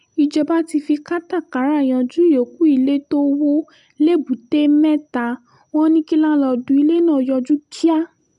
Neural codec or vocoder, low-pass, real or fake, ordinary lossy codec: none; 10.8 kHz; real; none